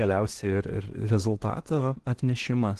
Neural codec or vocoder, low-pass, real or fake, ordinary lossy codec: codec, 16 kHz in and 24 kHz out, 0.8 kbps, FocalCodec, streaming, 65536 codes; 10.8 kHz; fake; Opus, 16 kbps